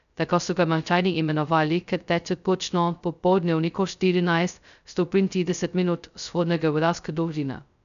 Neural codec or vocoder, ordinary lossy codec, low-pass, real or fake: codec, 16 kHz, 0.2 kbps, FocalCodec; none; 7.2 kHz; fake